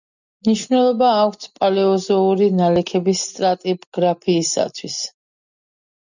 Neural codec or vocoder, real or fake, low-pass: none; real; 7.2 kHz